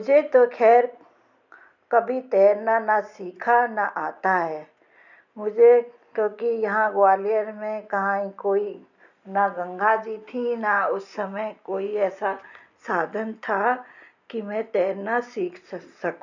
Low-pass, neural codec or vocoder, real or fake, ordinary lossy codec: 7.2 kHz; none; real; none